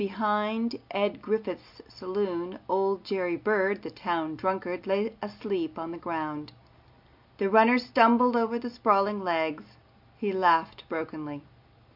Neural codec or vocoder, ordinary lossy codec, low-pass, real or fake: none; MP3, 48 kbps; 5.4 kHz; real